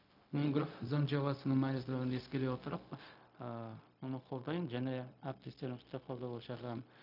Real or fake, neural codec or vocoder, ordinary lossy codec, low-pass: fake; codec, 16 kHz, 0.4 kbps, LongCat-Audio-Codec; none; 5.4 kHz